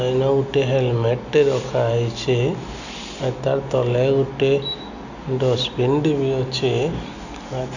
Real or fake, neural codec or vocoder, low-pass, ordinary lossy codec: real; none; 7.2 kHz; none